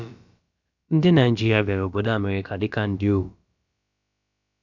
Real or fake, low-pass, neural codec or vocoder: fake; 7.2 kHz; codec, 16 kHz, about 1 kbps, DyCAST, with the encoder's durations